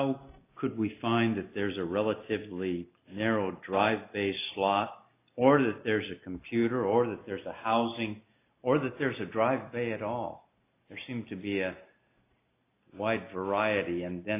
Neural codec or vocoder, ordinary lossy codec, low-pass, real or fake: none; AAC, 24 kbps; 3.6 kHz; real